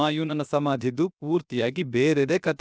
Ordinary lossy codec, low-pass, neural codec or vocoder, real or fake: none; none; codec, 16 kHz, 0.7 kbps, FocalCodec; fake